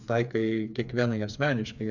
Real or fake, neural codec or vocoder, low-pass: fake; codec, 16 kHz, 4 kbps, FreqCodec, smaller model; 7.2 kHz